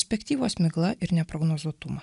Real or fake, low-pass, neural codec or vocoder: real; 10.8 kHz; none